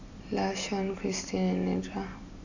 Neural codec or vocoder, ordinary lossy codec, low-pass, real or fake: none; none; 7.2 kHz; real